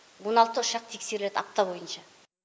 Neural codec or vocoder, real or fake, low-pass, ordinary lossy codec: none; real; none; none